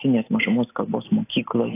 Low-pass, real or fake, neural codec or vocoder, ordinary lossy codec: 3.6 kHz; real; none; AAC, 24 kbps